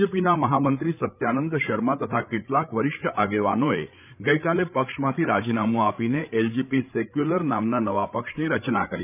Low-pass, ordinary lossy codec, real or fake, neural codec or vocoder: 3.6 kHz; none; fake; codec, 16 kHz, 8 kbps, FreqCodec, larger model